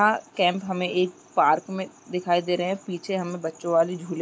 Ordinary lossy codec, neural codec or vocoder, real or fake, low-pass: none; none; real; none